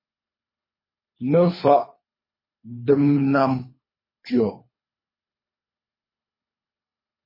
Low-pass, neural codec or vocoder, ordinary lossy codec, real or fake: 5.4 kHz; codec, 24 kHz, 3 kbps, HILCodec; MP3, 24 kbps; fake